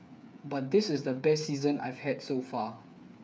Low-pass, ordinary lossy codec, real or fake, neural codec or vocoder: none; none; fake; codec, 16 kHz, 8 kbps, FreqCodec, smaller model